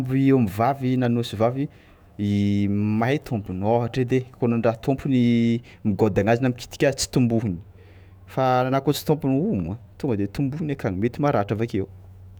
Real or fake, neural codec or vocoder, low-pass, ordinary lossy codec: fake; autoencoder, 48 kHz, 128 numbers a frame, DAC-VAE, trained on Japanese speech; none; none